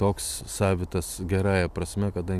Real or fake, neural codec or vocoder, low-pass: real; none; 14.4 kHz